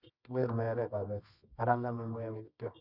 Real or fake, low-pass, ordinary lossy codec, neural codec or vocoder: fake; 5.4 kHz; none; codec, 24 kHz, 0.9 kbps, WavTokenizer, medium music audio release